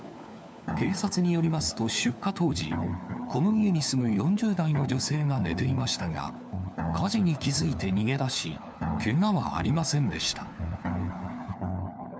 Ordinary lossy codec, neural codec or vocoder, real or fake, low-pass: none; codec, 16 kHz, 4 kbps, FunCodec, trained on LibriTTS, 50 frames a second; fake; none